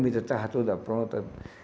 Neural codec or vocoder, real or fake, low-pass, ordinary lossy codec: none; real; none; none